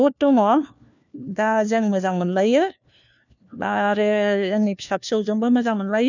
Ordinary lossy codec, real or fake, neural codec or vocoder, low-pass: none; fake; codec, 16 kHz, 1 kbps, FunCodec, trained on LibriTTS, 50 frames a second; 7.2 kHz